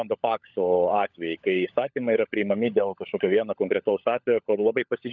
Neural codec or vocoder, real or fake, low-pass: codec, 16 kHz, 16 kbps, FunCodec, trained on LibriTTS, 50 frames a second; fake; 7.2 kHz